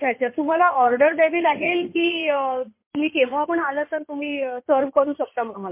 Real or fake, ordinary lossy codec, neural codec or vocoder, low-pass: fake; MP3, 24 kbps; codec, 16 kHz in and 24 kHz out, 2.2 kbps, FireRedTTS-2 codec; 3.6 kHz